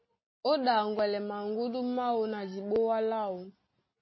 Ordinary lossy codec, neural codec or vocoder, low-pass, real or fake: MP3, 24 kbps; none; 7.2 kHz; real